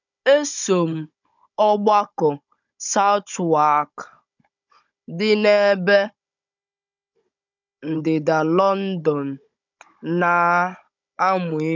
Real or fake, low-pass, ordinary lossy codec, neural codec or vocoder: fake; 7.2 kHz; none; codec, 16 kHz, 16 kbps, FunCodec, trained on Chinese and English, 50 frames a second